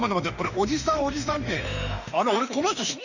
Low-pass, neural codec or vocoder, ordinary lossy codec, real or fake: 7.2 kHz; autoencoder, 48 kHz, 32 numbers a frame, DAC-VAE, trained on Japanese speech; none; fake